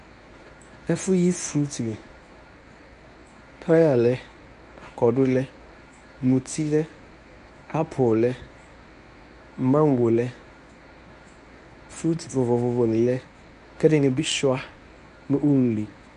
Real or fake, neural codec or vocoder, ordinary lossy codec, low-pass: fake; codec, 24 kHz, 0.9 kbps, WavTokenizer, medium speech release version 1; MP3, 96 kbps; 10.8 kHz